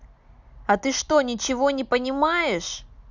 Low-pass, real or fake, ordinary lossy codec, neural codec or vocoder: 7.2 kHz; real; none; none